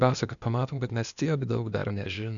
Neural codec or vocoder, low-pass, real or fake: codec, 16 kHz, 0.8 kbps, ZipCodec; 7.2 kHz; fake